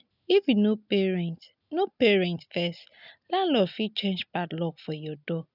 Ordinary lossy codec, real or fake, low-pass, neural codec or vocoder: none; real; 5.4 kHz; none